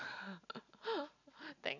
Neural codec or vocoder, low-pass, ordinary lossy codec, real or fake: none; 7.2 kHz; none; real